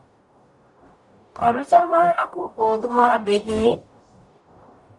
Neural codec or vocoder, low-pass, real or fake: codec, 44.1 kHz, 0.9 kbps, DAC; 10.8 kHz; fake